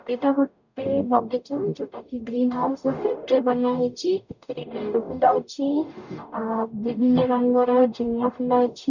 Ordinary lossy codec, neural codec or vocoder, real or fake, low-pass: none; codec, 44.1 kHz, 0.9 kbps, DAC; fake; 7.2 kHz